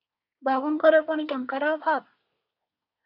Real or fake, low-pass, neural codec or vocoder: fake; 5.4 kHz; codec, 24 kHz, 1 kbps, SNAC